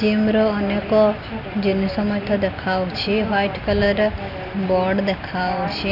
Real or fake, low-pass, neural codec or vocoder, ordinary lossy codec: real; 5.4 kHz; none; none